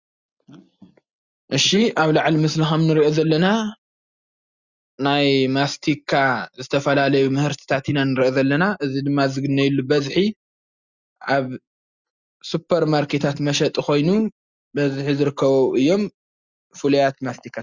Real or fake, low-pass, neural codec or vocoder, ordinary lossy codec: real; 7.2 kHz; none; Opus, 64 kbps